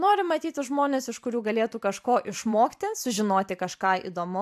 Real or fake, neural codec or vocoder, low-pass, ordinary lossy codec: fake; vocoder, 44.1 kHz, 128 mel bands every 512 samples, BigVGAN v2; 14.4 kHz; AAC, 96 kbps